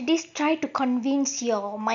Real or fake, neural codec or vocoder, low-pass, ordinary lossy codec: real; none; 7.2 kHz; AAC, 64 kbps